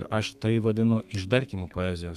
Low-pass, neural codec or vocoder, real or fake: 14.4 kHz; codec, 32 kHz, 1.9 kbps, SNAC; fake